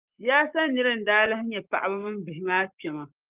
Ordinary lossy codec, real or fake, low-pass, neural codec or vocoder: Opus, 32 kbps; fake; 3.6 kHz; codec, 44.1 kHz, 7.8 kbps, Pupu-Codec